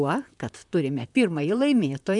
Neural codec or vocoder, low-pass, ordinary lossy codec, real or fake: vocoder, 44.1 kHz, 128 mel bands, Pupu-Vocoder; 10.8 kHz; MP3, 96 kbps; fake